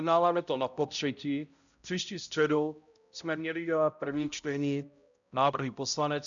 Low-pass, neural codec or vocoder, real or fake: 7.2 kHz; codec, 16 kHz, 0.5 kbps, X-Codec, HuBERT features, trained on balanced general audio; fake